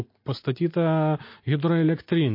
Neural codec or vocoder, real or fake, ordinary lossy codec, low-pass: none; real; MP3, 32 kbps; 5.4 kHz